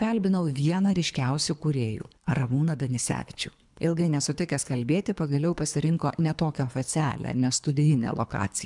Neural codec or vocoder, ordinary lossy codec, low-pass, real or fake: codec, 24 kHz, 3 kbps, HILCodec; MP3, 96 kbps; 10.8 kHz; fake